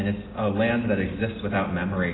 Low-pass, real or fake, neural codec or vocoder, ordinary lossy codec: 7.2 kHz; real; none; AAC, 16 kbps